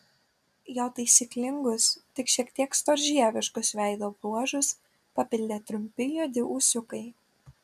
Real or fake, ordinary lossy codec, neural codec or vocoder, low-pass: real; MP3, 96 kbps; none; 14.4 kHz